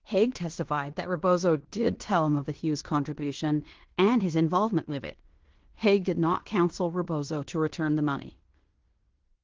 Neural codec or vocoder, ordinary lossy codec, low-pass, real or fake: codec, 16 kHz in and 24 kHz out, 0.9 kbps, LongCat-Audio-Codec, fine tuned four codebook decoder; Opus, 16 kbps; 7.2 kHz; fake